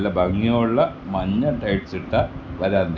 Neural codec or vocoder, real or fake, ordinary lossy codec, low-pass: none; real; none; none